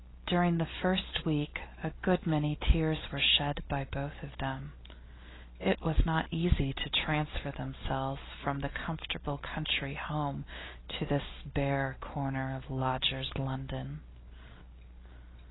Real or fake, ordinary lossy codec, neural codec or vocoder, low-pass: real; AAC, 16 kbps; none; 7.2 kHz